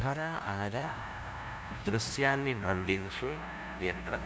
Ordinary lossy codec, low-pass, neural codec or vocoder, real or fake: none; none; codec, 16 kHz, 0.5 kbps, FunCodec, trained on LibriTTS, 25 frames a second; fake